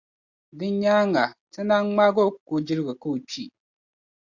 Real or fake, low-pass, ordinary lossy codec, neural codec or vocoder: real; 7.2 kHz; Opus, 64 kbps; none